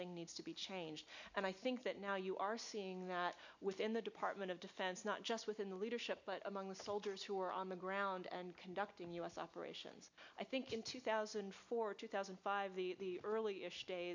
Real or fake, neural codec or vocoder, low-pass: real; none; 7.2 kHz